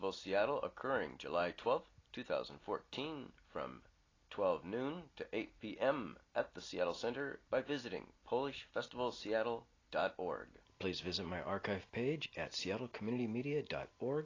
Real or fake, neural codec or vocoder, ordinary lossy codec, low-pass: real; none; AAC, 32 kbps; 7.2 kHz